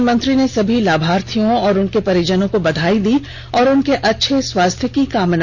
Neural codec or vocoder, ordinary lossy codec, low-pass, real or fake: none; none; none; real